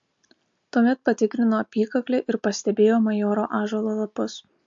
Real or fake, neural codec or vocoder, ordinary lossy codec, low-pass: real; none; MP3, 48 kbps; 7.2 kHz